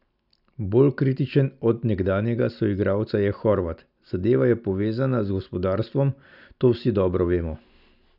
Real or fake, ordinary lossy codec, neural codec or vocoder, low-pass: real; none; none; 5.4 kHz